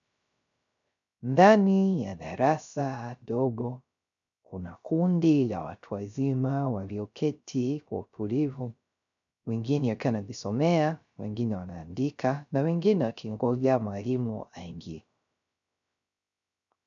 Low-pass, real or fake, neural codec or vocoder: 7.2 kHz; fake; codec, 16 kHz, 0.3 kbps, FocalCodec